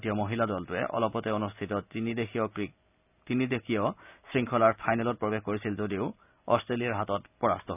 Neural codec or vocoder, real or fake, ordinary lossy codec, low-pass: none; real; none; 3.6 kHz